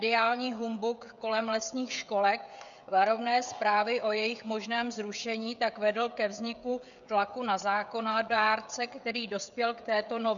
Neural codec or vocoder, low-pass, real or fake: codec, 16 kHz, 16 kbps, FreqCodec, smaller model; 7.2 kHz; fake